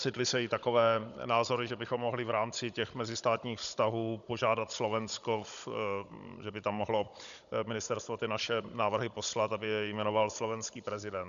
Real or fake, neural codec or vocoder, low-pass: fake; codec, 16 kHz, 16 kbps, FunCodec, trained on Chinese and English, 50 frames a second; 7.2 kHz